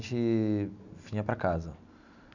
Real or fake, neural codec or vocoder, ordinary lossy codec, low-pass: real; none; none; 7.2 kHz